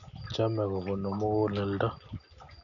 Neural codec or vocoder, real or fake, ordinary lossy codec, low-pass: none; real; none; 7.2 kHz